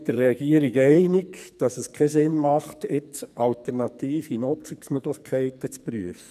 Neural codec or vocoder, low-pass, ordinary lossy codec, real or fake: codec, 44.1 kHz, 2.6 kbps, SNAC; 14.4 kHz; none; fake